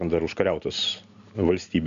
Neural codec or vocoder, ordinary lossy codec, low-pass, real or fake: none; AAC, 96 kbps; 7.2 kHz; real